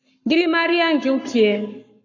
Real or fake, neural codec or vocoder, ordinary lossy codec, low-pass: fake; codec, 44.1 kHz, 7.8 kbps, Pupu-Codec; AAC, 48 kbps; 7.2 kHz